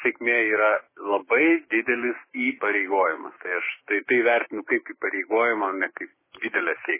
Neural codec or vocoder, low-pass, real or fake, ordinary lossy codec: codec, 16 kHz, 6 kbps, DAC; 3.6 kHz; fake; MP3, 16 kbps